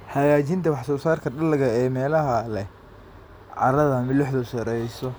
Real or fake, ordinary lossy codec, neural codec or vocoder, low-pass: real; none; none; none